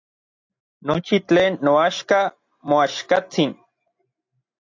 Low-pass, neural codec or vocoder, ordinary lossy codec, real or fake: 7.2 kHz; none; AAC, 48 kbps; real